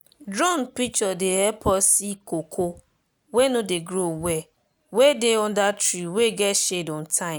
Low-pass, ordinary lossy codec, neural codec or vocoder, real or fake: none; none; none; real